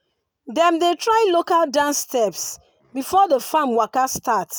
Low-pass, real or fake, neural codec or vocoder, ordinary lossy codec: none; real; none; none